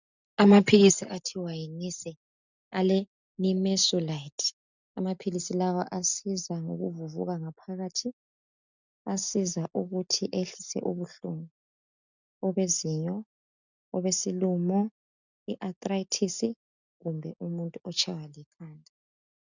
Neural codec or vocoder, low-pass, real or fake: none; 7.2 kHz; real